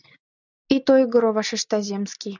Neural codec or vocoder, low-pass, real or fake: none; 7.2 kHz; real